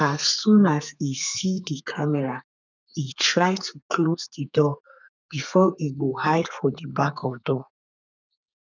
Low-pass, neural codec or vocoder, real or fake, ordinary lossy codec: 7.2 kHz; codec, 32 kHz, 1.9 kbps, SNAC; fake; none